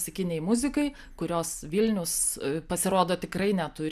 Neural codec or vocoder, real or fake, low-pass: none; real; 14.4 kHz